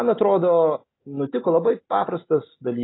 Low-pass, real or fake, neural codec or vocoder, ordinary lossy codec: 7.2 kHz; real; none; AAC, 16 kbps